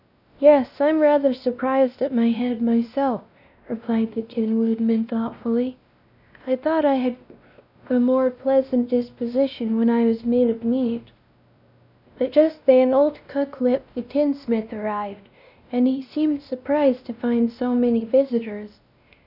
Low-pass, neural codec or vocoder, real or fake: 5.4 kHz; codec, 16 kHz, 1 kbps, X-Codec, WavLM features, trained on Multilingual LibriSpeech; fake